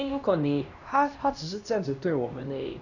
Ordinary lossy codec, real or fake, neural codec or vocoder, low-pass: none; fake; codec, 16 kHz, 1 kbps, X-Codec, HuBERT features, trained on LibriSpeech; 7.2 kHz